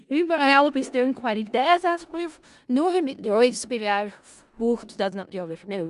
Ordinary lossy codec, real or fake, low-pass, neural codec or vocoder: Opus, 64 kbps; fake; 10.8 kHz; codec, 16 kHz in and 24 kHz out, 0.4 kbps, LongCat-Audio-Codec, four codebook decoder